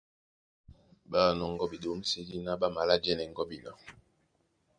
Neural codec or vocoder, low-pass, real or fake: none; 9.9 kHz; real